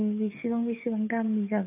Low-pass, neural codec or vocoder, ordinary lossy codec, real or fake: 3.6 kHz; codec, 44.1 kHz, 7.8 kbps, DAC; AAC, 32 kbps; fake